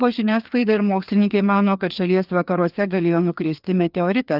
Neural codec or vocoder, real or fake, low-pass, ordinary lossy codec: codec, 16 kHz, 2 kbps, FreqCodec, larger model; fake; 5.4 kHz; Opus, 32 kbps